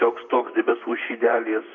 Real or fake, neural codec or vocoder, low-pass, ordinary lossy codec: fake; vocoder, 44.1 kHz, 128 mel bands, Pupu-Vocoder; 7.2 kHz; AAC, 48 kbps